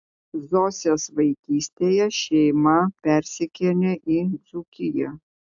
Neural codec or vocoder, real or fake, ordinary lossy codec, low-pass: none; real; AAC, 64 kbps; 7.2 kHz